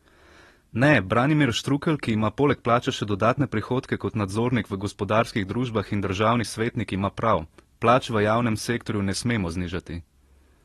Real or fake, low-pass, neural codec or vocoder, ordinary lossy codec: real; 19.8 kHz; none; AAC, 32 kbps